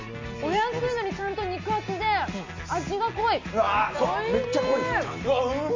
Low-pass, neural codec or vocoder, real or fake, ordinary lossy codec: 7.2 kHz; none; real; MP3, 48 kbps